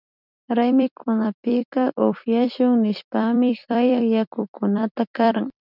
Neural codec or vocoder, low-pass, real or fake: vocoder, 44.1 kHz, 128 mel bands every 256 samples, BigVGAN v2; 5.4 kHz; fake